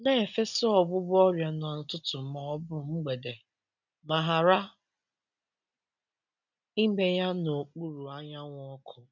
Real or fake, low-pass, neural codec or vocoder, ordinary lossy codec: real; 7.2 kHz; none; none